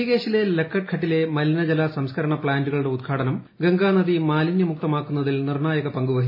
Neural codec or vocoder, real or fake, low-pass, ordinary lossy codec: none; real; 5.4 kHz; MP3, 24 kbps